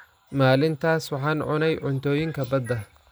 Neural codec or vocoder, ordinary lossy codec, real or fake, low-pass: none; none; real; none